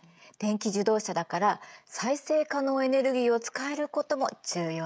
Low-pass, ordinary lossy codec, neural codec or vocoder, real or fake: none; none; codec, 16 kHz, 16 kbps, FreqCodec, smaller model; fake